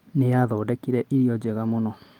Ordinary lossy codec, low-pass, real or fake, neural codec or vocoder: Opus, 24 kbps; 19.8 kHz; real; none